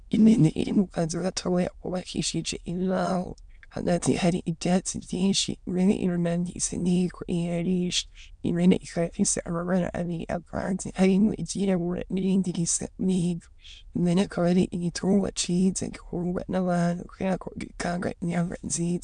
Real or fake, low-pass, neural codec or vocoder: fake; 9.9 kHz; autoencoder, 22.05 kHz, a latent of 192 numbers a frame, VITS, trained on many speakers